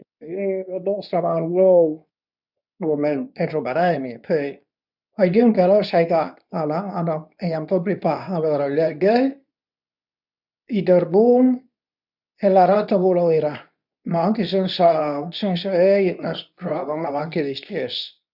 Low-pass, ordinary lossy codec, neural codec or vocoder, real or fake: 5.4 kHz; none; codec, 24 kHz, 0.9 kbps, WavTokenizer, medium speech release version 2; fake